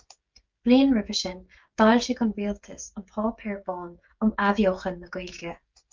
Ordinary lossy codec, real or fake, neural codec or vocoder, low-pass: Opus, 24 kbps; fake; codec, 16 kHz, 16 kbps, FreqCodec, smaller model; 7.2 kHz